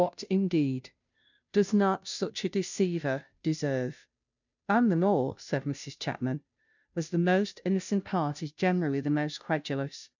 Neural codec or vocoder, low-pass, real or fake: codec, 16 kHz, 0.5 kbps, FunCodec, trained on Chinese and English, 25 frames a second; 7.2 kHz; fake